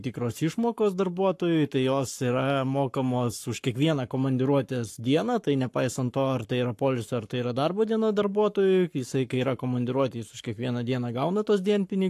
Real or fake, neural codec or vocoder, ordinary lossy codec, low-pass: fake; codec, 44.1 kHz, 7.8 kbps, Pupu-Codec; AAC, 64 kbps; 14.4 kHz